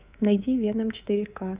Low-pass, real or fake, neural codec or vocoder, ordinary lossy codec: 3.6 kHz; fake; codec, 24 kHz, 3.1 kbps, DualCodec; Opus, 64 kbps